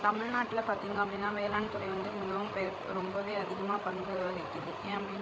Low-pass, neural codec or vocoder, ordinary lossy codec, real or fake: none; codec, 16 kHz, 8 kbps, FreqCodec, larger model; none; fake